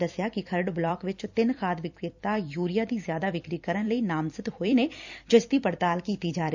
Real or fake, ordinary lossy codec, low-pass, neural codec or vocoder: fake; none; 7.2 kHz; vocoder, 44.1 kHz, 128 mel bands every 256 samples, BigVGAN v2